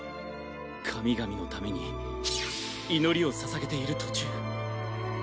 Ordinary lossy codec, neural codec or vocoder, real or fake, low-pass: none; none; real; none